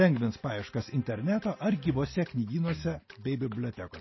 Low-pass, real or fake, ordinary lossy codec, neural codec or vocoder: 7.2 kHz; real; MP3, 24 kbps; none